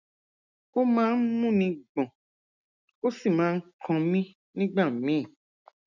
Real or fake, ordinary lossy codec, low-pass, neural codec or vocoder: real; none; 7.2 kHz; none